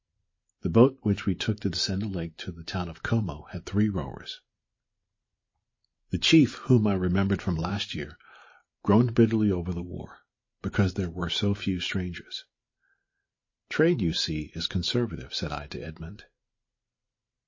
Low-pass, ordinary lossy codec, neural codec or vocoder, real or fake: 7.2 kHz; MP3, 32 kbps; vocoder, 44.1 kHz, 128 mel bands every 512 samples, BigVGAN v2; fake